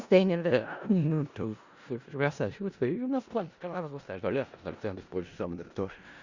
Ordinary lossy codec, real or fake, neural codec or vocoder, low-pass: Opus, 64 kbps; fake; codec, 16 kHz in and 24 kHz out, 0.4 kbps, LongCat-Audio-Codec, four codebook decoder; 7.2 kHz